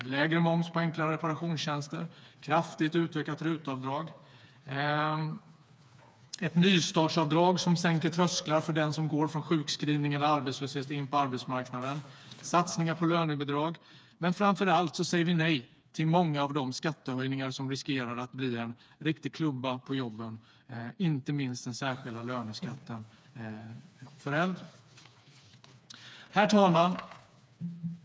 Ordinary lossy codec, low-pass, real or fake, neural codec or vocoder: none; none; fake; codec, 16 kHz, 4 kbps, FreqCodec, smaller model